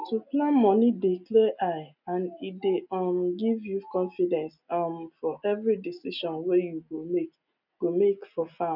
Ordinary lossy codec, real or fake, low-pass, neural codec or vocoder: none; real; 5.4 kHz; none